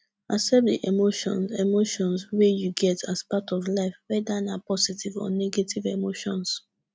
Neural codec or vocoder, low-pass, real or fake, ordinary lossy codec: none; none; real; none